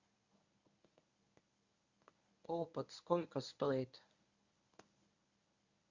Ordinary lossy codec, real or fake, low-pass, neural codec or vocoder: Opus, 64 kbps; fake; 7.2 kHz; codec, 24 kHz, 0.9 kbps, WavTokenizer, medium speech release version 1